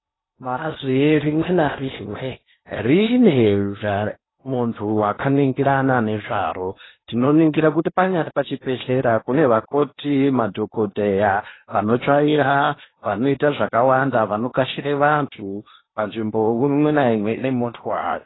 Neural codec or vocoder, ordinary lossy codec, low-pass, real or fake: codec, 16 kHz in and 24 kHz out, 0.8 kbps, FocalCodec, streaming, 65536 codes; AAC, 16 kbps; 7.2 kHz; fake